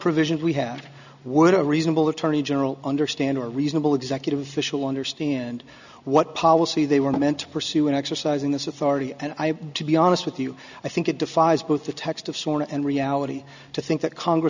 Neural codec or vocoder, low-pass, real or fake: none; 7.2 kHz; real